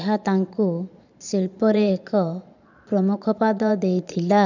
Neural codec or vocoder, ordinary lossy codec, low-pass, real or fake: vocoder, 22.05 kHz, 80 mel bands, Vocos; none; 7.2 kHz; fake